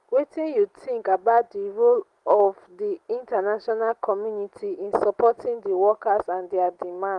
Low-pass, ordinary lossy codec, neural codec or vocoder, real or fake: 10.8 kHz; Opus, 24 kbps; none; real